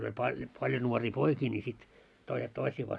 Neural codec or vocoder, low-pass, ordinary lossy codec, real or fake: none; none; none; real